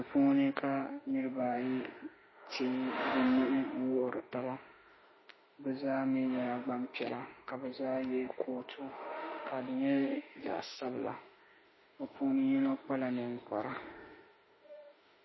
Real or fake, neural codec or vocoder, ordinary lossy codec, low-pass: fake; autoencoder, 48 kHz, 32 numbers a frame, DAC-VAE, trained on Japanese speech; MP3, 24 kbps; 7.2 kHz